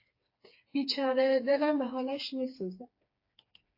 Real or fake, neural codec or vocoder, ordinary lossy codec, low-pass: fake; codec, 16 kHz, 4 kbps, FreqCodec, smaller model; AAC, 32 kbps; 5.4 kHz